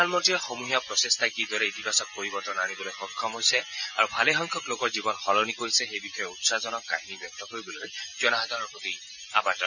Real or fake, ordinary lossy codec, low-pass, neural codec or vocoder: real; none; 7.2 kHz; none